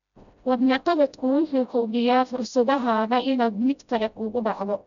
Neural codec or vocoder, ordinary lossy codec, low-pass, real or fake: codec, 16 kHz, 0.5 kbps, FreqCodec, smaller model; none; 7.2 kHz; fake